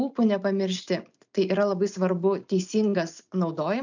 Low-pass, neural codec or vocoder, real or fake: 7.2 kHz; none; real